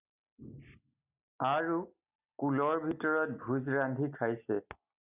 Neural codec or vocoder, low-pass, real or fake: none; 3.6 kHz; real